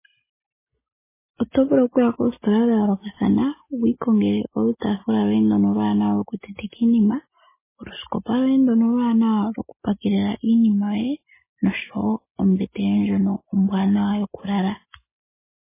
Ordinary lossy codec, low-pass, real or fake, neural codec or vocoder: MP3, 16 kbps; 3.6 kHz; real; none